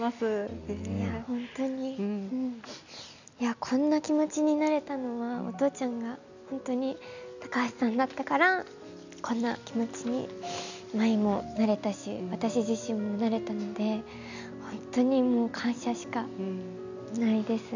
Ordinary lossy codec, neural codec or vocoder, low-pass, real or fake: none; none; 7.2 kHz; real